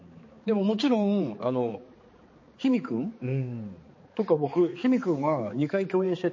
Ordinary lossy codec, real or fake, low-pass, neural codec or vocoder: MP3, 32 kbps; fake; 7.2 kHz; codec, 16 kHz, 4 kbps, X-Codec, HuBERT features, trained on balanced general audio